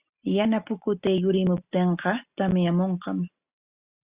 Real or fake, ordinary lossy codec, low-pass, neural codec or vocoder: real; Opus, 64 kbps; 3.6 kHz; none